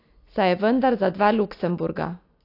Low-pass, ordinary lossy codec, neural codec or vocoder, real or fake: 5.4 kHz; AAC, 32 kbps; none; real